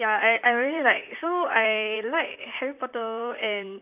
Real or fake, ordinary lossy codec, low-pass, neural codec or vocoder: fake; none; 3.6 kHz; vocoder, 44.1 kHz, 128 mel bands, Pupu-Vocoder